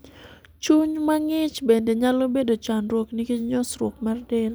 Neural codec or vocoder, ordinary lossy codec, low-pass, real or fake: none; none; none; real